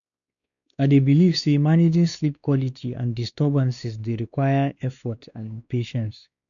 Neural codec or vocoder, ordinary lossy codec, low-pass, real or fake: codec, 16 kHz, 2 kbps, X-Codec, WavLM features, trained on Multilingual LibriSpeech; none; 7.2 kHz; fake